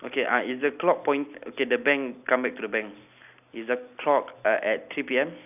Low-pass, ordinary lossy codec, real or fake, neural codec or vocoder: 3.6 kHz; none; fake; vocoder, 44.1 kHz, 128 mel bands every 256 samples, BigVGAN v2